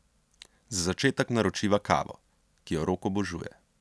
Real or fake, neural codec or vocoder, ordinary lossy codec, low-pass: real; none; none; none